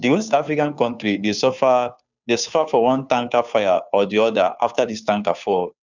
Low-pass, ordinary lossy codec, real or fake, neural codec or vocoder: 7.2 kHz; none; fake; codec, 16 kHz, 2 kbps, FunCodec, trained on Chinese and English, 25 frames a second